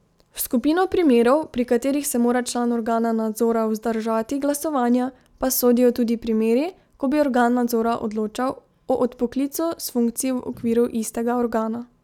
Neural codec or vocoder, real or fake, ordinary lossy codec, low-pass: none; real; none; 19.8 kHz